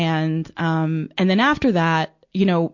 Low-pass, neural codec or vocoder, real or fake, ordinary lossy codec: 7.2 kHz; none; real; MP3, 48 kbps